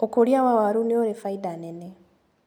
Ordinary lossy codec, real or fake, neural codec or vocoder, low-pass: none; real; none; none